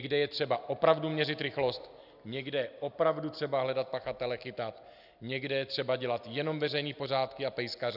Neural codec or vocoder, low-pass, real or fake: none; 5.4 kHz; real